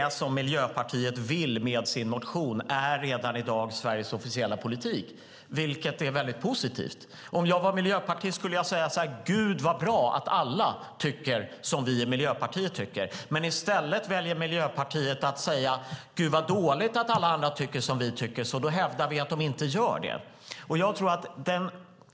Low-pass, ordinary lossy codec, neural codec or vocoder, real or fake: none; none; none; real